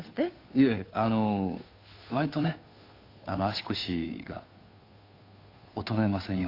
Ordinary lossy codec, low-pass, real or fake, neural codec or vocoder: AAC, 32 kbps; 5.4 kHz; fake; codec, 16 kHz, 2 kbps, FunCodec, trained on Chinese and English, 25 frames a second